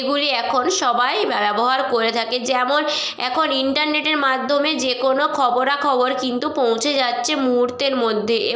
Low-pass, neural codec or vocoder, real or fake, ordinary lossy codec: none; none; real; none